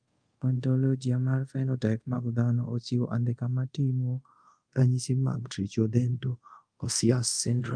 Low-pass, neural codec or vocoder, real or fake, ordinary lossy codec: 9.9 kHz; codec, 24 kHz, 0.5 kbps, DualCodec; fake; Opus, 32 kbps